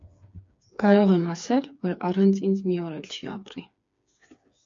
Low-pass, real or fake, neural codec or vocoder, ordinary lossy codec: 7.2 kHz; fake; codec, 16 kHz, 4 kbps, FreqCodec, smaller model; MP3, 48 kbps